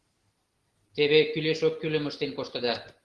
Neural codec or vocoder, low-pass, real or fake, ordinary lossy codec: none; 10.8 kHz; real; Opus, 16 kbps